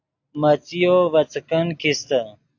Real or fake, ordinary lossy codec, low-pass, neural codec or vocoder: real; AAC, 48 kbps; 7.2 kHz; none